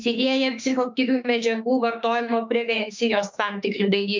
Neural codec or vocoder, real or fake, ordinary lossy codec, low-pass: autoencoder, 48 kHz, 32 numbers a frame, DAC-VAE, trained on Japanese speech; fake; MP3, 64 kbps; 7.2 kHz